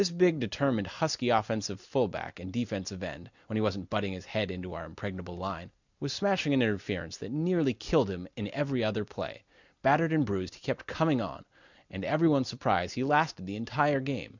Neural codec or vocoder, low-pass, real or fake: none; 7.2 kHz; real